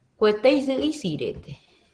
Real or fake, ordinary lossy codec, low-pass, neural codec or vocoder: real; Opus, 16 kbps; 9.9 kHz; none